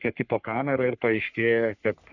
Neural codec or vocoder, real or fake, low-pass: codec, 44.1 kHz, 3.4 kbps, Pupu-Codec; fake; 7.2 kHz